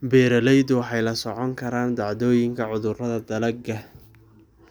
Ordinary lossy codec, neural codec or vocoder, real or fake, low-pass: none; none; real; none